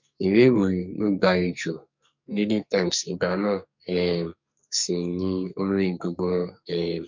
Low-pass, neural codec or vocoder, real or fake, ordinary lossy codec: 7.2 kHz; codec, 44.1 kHz, 2.6 kbps, SNAC; fake; MP3, 48 kbps